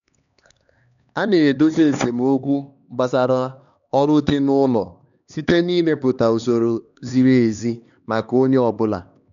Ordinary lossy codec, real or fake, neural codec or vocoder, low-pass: none; fake; codec, 16 kHz, 2 kbps, X-Codec, HuBERT features, trained on LibriSpeech; 7.2 kHz